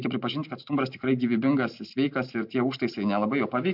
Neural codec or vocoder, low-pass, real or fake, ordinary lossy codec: none; 5.4 kHz; real; MP3, 48 kbps